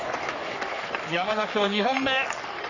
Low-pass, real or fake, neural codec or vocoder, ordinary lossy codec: 7.2 kHz; fake; codec, 44.1 kHz, 3.4 kbps, Pupu-Codec; none